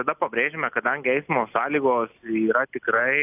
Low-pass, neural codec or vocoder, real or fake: 3.6 kHz; none; real